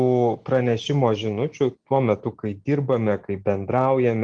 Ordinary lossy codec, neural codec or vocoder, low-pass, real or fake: Opus, 24 kbps; none; 9.9 kHz; real